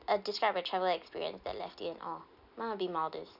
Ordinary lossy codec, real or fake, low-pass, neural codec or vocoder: none; real; 5.4 kHz; none